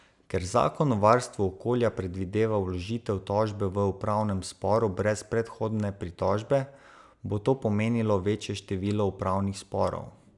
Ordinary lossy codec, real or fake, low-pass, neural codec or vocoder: none; real; 10.8 kHz; none